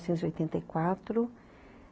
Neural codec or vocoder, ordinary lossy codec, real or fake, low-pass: none; none; real; none